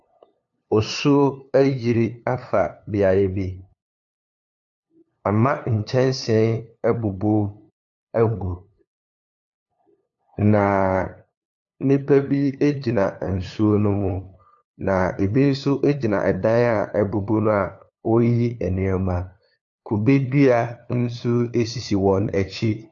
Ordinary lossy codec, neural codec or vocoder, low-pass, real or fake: AAC, 64 kbps; codec, 16 kHz, 2 kbps, FunCodec, trained on LibriTTS, 25 frames a second; 7.2 kHz; fake